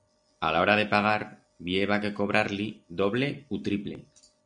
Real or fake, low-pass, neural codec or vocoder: real; 9.9 kHz; none